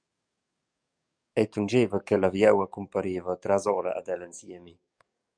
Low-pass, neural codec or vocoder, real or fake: 9.9 kHz; codec, 44.1 kHz, 7.8 kbps, DAC; fake